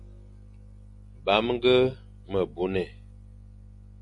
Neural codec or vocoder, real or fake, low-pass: none; real; 10.8 kHz